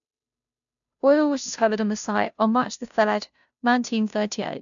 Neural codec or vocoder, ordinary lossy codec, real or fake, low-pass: codec, 16 kHz, 0.5 kbps, FunCodec, trained on Chinese and English, 25 frames a second; none; fake; 7.2 kHz